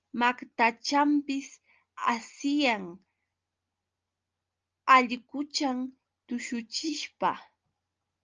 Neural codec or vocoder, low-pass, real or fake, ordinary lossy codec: none; 7.2 kHz; real; Opus, 24 kbps